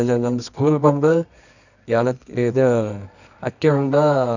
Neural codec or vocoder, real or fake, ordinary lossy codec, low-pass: codec, 24 kHz, 0.9 kbps, WavTokenizer, medium music audio release; fake; none; 7.2 kHz